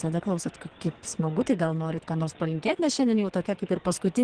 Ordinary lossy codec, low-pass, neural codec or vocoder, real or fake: Opus, 16 kbps; 9.9 kHz; codec, 44.1 kHz, 2.6 kbps, SNAC; fake